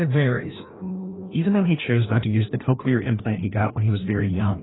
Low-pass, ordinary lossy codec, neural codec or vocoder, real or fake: 7.2 kHz; AAC, 16 kbps; codec, 16 kHz, 1 kbps, FreqCodec, larger model; fake